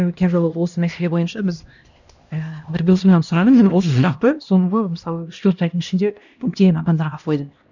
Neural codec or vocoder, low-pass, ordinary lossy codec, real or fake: codec, 16 kHz, 1 kbps, X-Codec, HuBERT features, trained on LibriSpeech; 7.2 kHz; none; fake